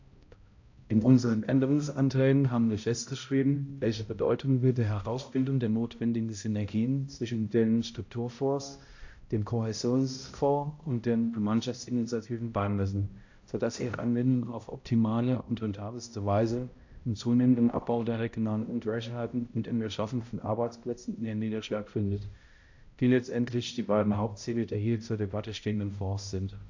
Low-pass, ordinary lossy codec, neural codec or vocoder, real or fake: 7.2 kHz; AAC, 48 kbps; codec, 16 kHz, 0.5 kbps, X-Codec, HuBERT features, trained on balanced general audio; fake